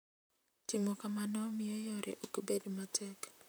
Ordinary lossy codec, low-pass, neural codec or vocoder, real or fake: none; none; vocoder, 44.1 kHz, 128 mel bands, Pupu-Vocoder; fake